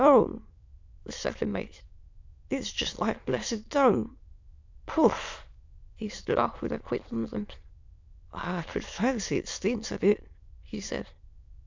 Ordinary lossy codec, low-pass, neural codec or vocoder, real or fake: MP3, 64 kbps; 7.2 kHz; autoencoder, 22.05 kHz, a latent of 192 numbers a frame, VITS, trained on many speakers; fake